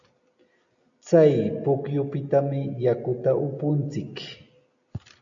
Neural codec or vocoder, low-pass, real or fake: none; 7.2 kHz; real